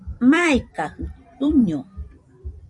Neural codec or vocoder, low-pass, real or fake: vocoder, 44.1 kHz, 128 mel bands every 256 samples, BigVGAN v2; 10.8 kHz; fake